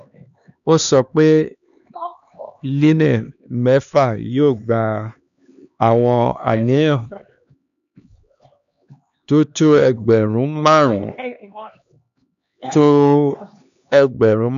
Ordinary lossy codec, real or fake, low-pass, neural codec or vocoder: none; fake; 7.2 kHz; codec, 16 kHz, 2 kbps, X-Codec, HuBERT features, trained on LibriSpeech